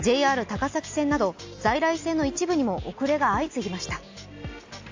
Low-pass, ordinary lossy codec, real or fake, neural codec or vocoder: 7.2 kHz; AAC, 48 kbps; real; none